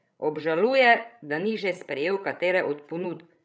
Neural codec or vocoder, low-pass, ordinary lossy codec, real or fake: codec, 16 kHz, 16 kbps, FreqCodec, larger model; none; none; fake